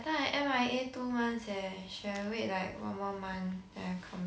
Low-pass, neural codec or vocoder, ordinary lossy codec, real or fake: none; none; none; real